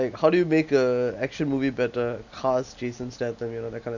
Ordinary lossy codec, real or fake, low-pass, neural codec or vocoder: none; real; 7.2 kHz; none